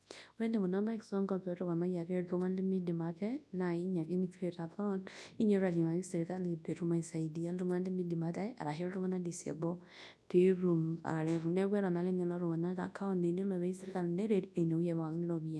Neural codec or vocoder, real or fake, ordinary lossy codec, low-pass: codec, 24 kHz, 0.9 kbps, WavTokenizer, large speech release; fake; none; none